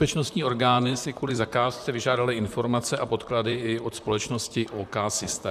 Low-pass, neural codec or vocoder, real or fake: 14.4 kHz; vocoder, 44.1 kHz, 128 mel bands, Pupu-Vocoder; fake